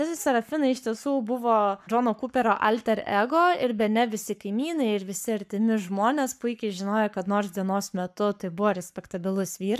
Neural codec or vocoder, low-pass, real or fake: codec, 44.1 kHz, 7.8 kbps, Pupu-Codec; 14.4 kHz; fake